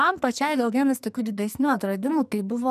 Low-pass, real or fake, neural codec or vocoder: 14.4 kHz; fake; codec, 44.1 kHz, 2.6 kbps, SNAC